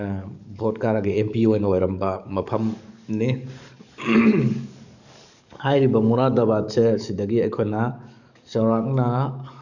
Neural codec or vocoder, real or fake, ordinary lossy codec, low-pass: codec, 44.1 kHz, 7.8 kbps, DAC; fake; none; 7.2 kHz